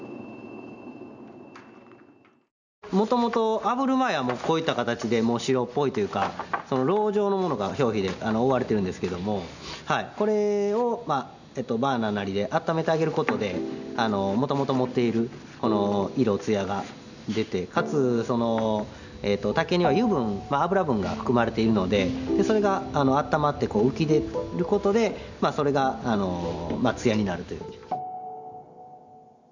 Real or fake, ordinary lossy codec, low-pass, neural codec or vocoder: real; none; 7.2 kHz; none